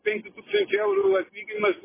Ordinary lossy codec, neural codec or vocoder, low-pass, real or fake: MP3, 16 kbps; none; 3.6 kHz; real